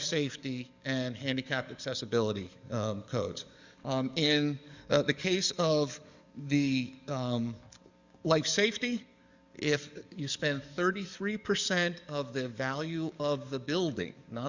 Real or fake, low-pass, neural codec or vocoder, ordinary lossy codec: fake; 7.2 kHz; codec, 44.1 kHz, 7.8 kbps, DAC; Opus, 64 kbps